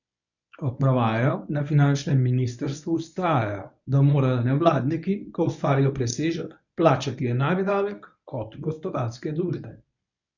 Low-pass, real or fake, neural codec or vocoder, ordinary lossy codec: 7.2 kHz; fake; codec, 24 kHz, 0.9 kbps, WavTokenizer, medium speech release version 1; none